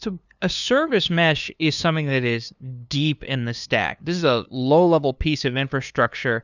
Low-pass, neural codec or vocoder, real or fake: 7.2 kHz; codec, 16 kHz, 2 kbps, FunCodec, trained on LibriTTS, 25 frames a second; fake